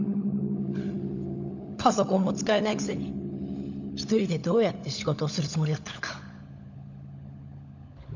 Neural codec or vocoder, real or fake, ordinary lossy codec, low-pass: codec, 16 kHz, 16 kbps, FunCodec, trained on LibriTTS, 50 frames a second; fake; none; 7.2 kHz